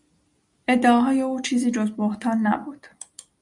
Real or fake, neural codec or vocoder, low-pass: real; none; 10.8 kHz